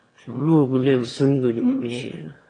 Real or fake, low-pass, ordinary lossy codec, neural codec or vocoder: fake; 9.9 kHz; AAC, 32 kbps; autoencoder, 22.05 kHz, a latent of 192 numbers a frame, VITS, trained on one speaker